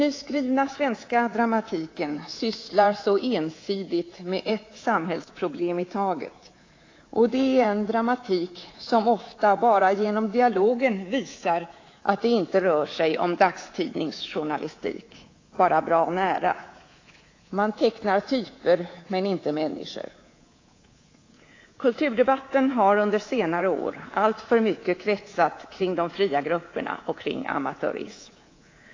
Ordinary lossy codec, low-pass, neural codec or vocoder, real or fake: AAC, 32 kbps; 7.2 kHz; codec, 24 kHz, 3.1 kbps, DualCodec; fake